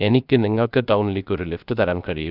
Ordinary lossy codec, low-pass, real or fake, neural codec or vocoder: none; 5.4 kHz; fake; codec, 16 kHz, 0.3 kbps, FocalCodec